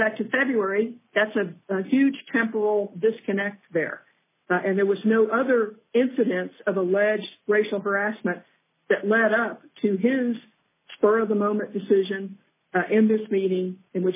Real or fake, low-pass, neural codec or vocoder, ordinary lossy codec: real; 3.6 kHz; none; MP3, 16 kbps